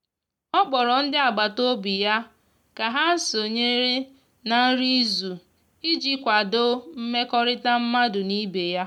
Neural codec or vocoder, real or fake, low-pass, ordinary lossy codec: none; real; 19.8 kHz; none